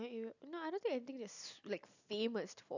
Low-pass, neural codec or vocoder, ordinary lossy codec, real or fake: 7.2 kHz; none; none; real